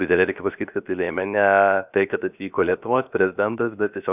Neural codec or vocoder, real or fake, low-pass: codec, 16 kHz, 0.7 kbps, FocalCodec; fake; 3.6 kHz